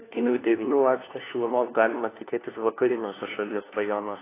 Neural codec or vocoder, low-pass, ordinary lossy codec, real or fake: codec, 16 kHz, 1 kbps, FunCodec, trained on LibriTTS, 50 frames a second; 3.6 kHz; AAC, 16 kbps; fake